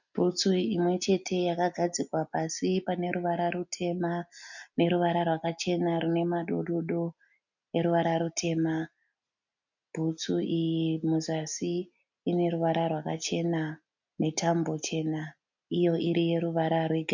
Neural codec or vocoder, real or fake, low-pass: none; real; 7.2 kHz